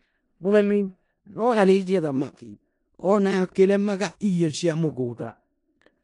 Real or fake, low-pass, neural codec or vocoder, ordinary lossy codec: fake; 10.8 kHz; codec, 16 kHz in and 24 kHz out, 0.4 kbps, LongCat-Audio-Codec, four codebook decoder; none